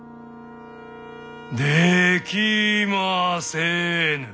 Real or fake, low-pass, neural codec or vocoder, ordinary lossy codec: real; none; none; none